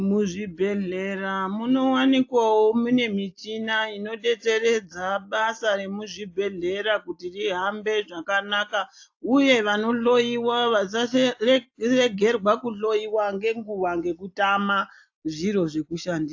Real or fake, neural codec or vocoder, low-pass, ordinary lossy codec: real; none; 7.2 kHz; AAC, 48 kbps